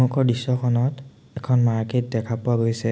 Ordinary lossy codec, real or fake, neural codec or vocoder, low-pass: none; real; none; none